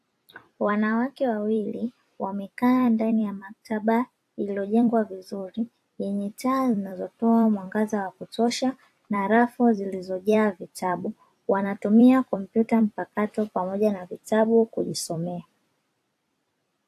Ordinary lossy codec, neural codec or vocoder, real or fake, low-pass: AAC, 64 kbps; vocoder, 44.1 kHz, 128 mel bands every 256 samples, BigVGAN v2; fake; 14.4 kHz